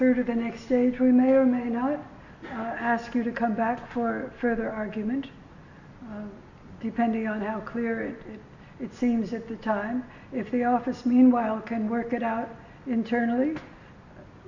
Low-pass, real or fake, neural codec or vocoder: 7.2 kHz; real; none